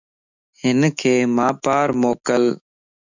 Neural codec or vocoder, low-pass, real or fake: autoencoder, 48 kHz, 128 numbers a frame, DAC-VAE, trained on Japanese speech; 7.2 kHz; fake